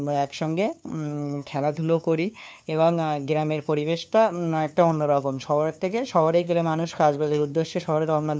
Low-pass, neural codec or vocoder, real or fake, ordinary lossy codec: none; codec, 16 kHz, 2 kbps, FunCodec, trained on LibriTTS, 25 frames a second; fake; none